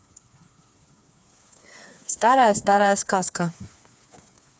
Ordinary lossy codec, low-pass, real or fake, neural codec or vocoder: none; none; fake; codec, 16 kHz, 4 kbps, FreqCodec, smaller model